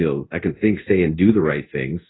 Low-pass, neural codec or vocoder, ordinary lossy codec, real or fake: 7.2 kHz; codec, 24 kHz, 0.5 kbps, DualCodec; AAC, 16 kbps; fake